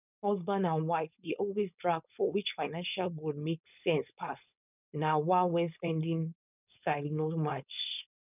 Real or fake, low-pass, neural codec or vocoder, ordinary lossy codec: fake; 3.6 kHz; codec, 16 kHz, 4.8 kbps, FACodec; none